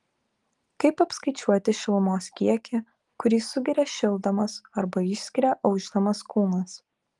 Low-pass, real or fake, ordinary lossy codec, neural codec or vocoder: 10.8 kHz; real; Opus, 24 kbps; none